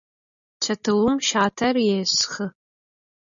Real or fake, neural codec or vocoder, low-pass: real; none; 7.2 kHz